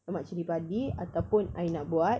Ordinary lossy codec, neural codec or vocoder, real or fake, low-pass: none; none; real; none